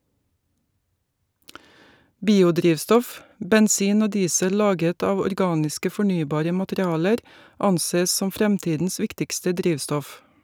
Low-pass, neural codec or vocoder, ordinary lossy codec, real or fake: none; none; none; real